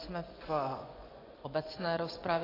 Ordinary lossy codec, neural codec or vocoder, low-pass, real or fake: AAC, 32 kbps; vocoder, 24 kHz, 100 mel bands, Vocos; 5.4 kHz; fake